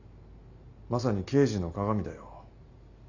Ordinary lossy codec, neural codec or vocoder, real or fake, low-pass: none; none; real; 7.2 kHz